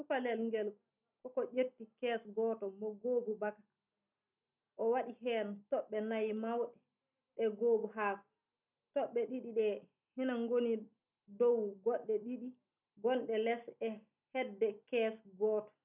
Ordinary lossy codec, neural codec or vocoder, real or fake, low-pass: none; none; real; 3.6 kHz